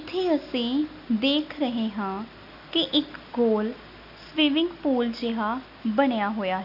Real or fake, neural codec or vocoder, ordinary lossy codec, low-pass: real; none; none; 5.4 kHz